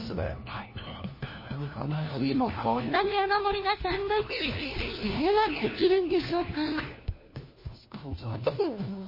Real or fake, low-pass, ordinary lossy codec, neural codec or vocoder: fake; 5.4 kHz; MP3, 24 kbps; codec, 16 kHz, 1 kbps, FunCodec, trained on LibriTTS, 50 frames a second